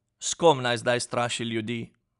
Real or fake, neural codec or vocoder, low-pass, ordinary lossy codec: real; none; 10.8 kHz; none